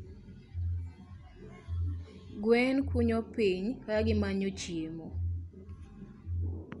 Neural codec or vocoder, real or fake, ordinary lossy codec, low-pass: none; real; Opus, 64 kbps; 10.8 kHz